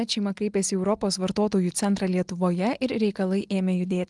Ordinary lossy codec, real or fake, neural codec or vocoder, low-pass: Opus, 24 kbps; real; none; 10.8 kHz